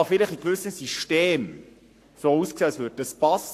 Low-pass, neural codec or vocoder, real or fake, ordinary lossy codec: 14.4 kHz; codec, 44.1 kHz, 7.8 kbps, Pupu-Codec; fake; AAC, 64 kbps